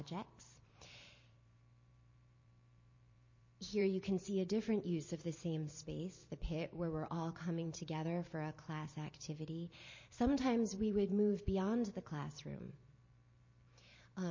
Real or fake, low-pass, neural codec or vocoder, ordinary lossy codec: fake; 7.2 kHz; vocoder, 44.1 kHz, 128 mel bands every 512 samples, BigVGAN v2; MP3, 32 kbps